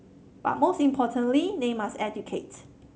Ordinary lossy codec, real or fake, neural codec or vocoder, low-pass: none; real; none; none